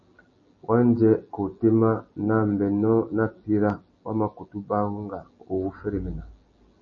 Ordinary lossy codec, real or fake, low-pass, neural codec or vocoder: MP3, 32 kbps; real; 7.2 kHz; none